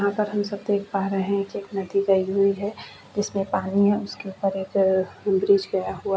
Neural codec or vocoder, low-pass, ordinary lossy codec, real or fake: none; none; none; real